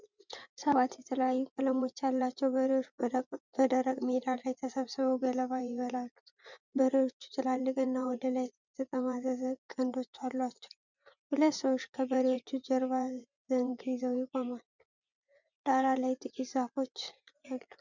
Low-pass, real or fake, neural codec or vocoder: 7.2 kHz; fake; vocoder, 24 kHz, 100 mel bands, Vocos